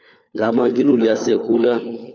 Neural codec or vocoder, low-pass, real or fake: codec, 16 kHz, 4 kbps, FunCodec, trained on LibriTTS, 50 frames a second; 7.2 kHz; fake